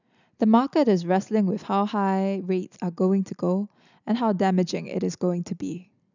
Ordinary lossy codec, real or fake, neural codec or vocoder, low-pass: none; real; none; 7.2 kHz